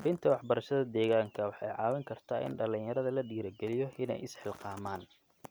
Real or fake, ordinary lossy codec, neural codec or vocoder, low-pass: real; none; none; none